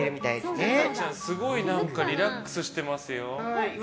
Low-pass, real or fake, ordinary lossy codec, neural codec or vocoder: none; real; none; none